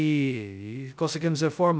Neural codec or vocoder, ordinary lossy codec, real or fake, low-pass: codec, 16 kHz, 0.2 kbps, FocalCodec; none; fake; none